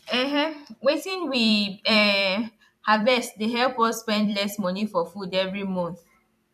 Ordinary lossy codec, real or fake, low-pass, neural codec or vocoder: none; fake; 14.4 kHz; vocoder, 44.1 kHz, 128 mel bands every 256 samples, BigVGAN v2